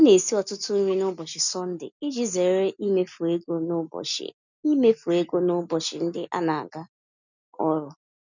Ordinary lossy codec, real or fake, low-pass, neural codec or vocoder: none; real; 7.2 kHz; none